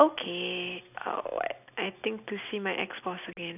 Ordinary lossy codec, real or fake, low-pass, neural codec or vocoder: none; real; 3.6 kHz; none